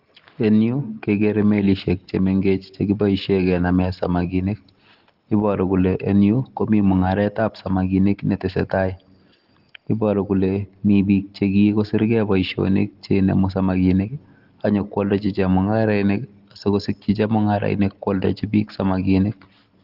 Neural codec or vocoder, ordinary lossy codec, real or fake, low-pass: none; Opus, 16 kbps; real; 5.4 kHz